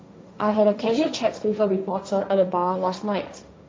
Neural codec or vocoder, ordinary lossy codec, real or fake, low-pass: codec, 16 kHz, 1.1 kbps, Voila-Tokenizer; none; fake; none